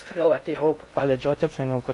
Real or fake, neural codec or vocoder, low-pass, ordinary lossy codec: fake; codec, 16 kHz in and 24 kHz out, 0.6 kbps, FocalCodec, streaming, 2048 codes; 10.8 kHz; AAC, 48 kbps